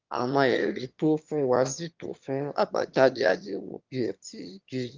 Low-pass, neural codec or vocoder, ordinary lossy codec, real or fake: 7.2 kHz; autoencoder, 22.05 kHz, a latent of 192 numbers a frame, VITS, trained on one speaker; Opus, 32 kbps; fake